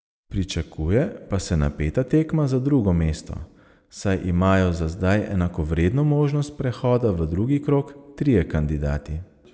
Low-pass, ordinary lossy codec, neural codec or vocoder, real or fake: none; none; none; real